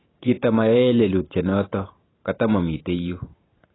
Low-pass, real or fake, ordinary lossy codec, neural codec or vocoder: 7.2 kHz; fake; AAC, 16 kbps; vocoder, 44.1 kHz, 128 mel bands every 512 samples, BigVGAN v2